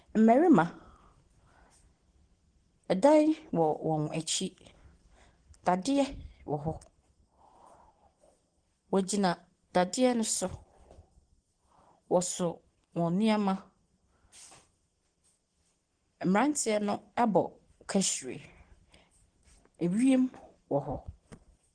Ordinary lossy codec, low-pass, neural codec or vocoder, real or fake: Opus, 16 kbps; 9.9 kHz; none; real